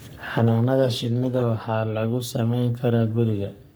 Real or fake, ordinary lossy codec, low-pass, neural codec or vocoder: fake; none; none; codec, 44.1 kHz, 3.4 kbps, Pupu-Codec